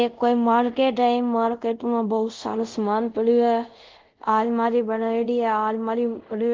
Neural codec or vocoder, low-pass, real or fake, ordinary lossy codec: codec, 24 kHz, 0.5 kbps, DualCodec; 7.2 kHz; fake; Opus, 16 kbps